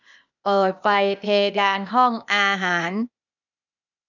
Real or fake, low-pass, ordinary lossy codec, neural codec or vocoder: fake; 7.2 kHz; none; codec, 16 kHz, 0.8 kbps, ZipCodec